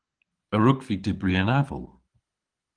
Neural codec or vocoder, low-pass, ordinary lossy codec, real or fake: codec, 24 kHz, 6 kbps, HILCodec; 9.9 kHz; Opus, 24 kbps; fake